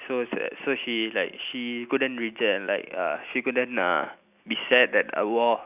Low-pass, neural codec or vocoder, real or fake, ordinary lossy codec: 3.6 kHz; none; real; none